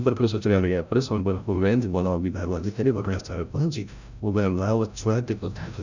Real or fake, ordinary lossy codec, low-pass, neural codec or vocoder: fake; none; 7.2 kHz; codec, 16 kHz, 0.5 kbps, FreqCodec, larger model